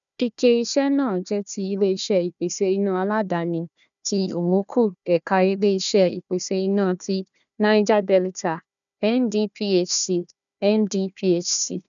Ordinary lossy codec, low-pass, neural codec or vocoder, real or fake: none; 7.2 kHz; codec, 16 kHz, 1 kbps, FunCodec, trained on Chinese and English, 50 frames a second; fake